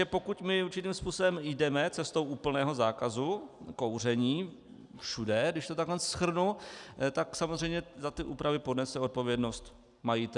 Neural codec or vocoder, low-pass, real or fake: none; 10.8 kHz; real